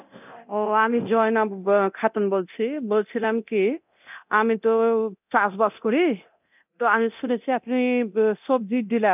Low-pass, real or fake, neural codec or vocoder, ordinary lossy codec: 3.6 kHz; fake; codec, 24 kHz, 0.9 kbps, DualCodec; none